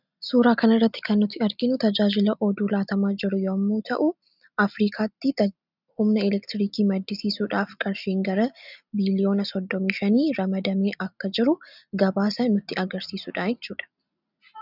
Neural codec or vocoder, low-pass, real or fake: none; 5.4 kHz; real